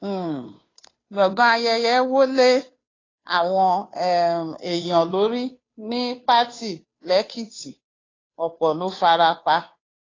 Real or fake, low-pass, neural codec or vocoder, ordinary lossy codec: fake; 7.2 kHz; codec, 16 kHz, 2 kbps, FunCodec, trained on Chinese and English, 25 frames a second; AAC, 32 kbps